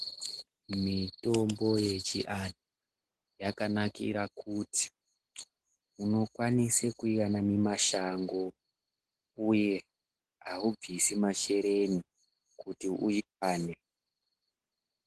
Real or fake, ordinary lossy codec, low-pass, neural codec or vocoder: real; Opus, 16 kbps; 10.8 kHz; none